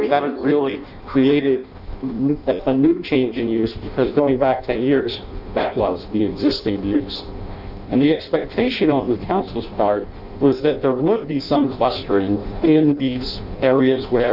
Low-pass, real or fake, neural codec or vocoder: 5.4 kHz; fake; codec, 16 kHz in and 24 kHz out, 0.6 kbps, FireRedTTS-2 codec